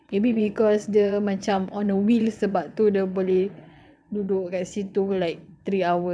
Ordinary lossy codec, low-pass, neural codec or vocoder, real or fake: none; none; vocoder, 22.05 kHz, 80 mel bands, WaveNeXt; fake